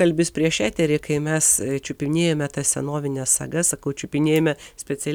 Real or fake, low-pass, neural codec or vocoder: real; 19.8 kHz; none